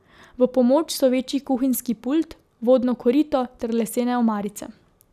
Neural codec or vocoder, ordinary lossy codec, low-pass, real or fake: none; none; 14.4 kHz; real